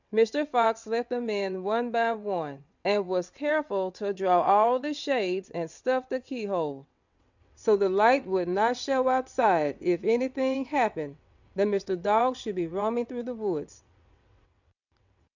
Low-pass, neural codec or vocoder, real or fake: 7.2 kHz; vocoder, 22.05 kHz, 80 mel bands, WaveNeXt; fake